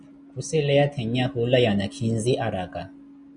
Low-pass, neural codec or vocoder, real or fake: 9.9 kHz; none; real